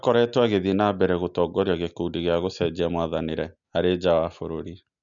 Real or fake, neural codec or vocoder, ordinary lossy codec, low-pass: real; none; none; 7.2 kHz